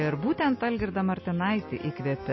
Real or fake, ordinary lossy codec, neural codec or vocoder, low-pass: real; MP3, 24 kbps; none; 7.2 kHz